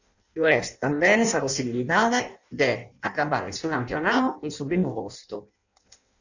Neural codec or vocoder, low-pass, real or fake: codec, 16 kHz in and 24 kHz out, 0.6 kbps, FireRedTTS-2 codec; 7.2 kHz; fake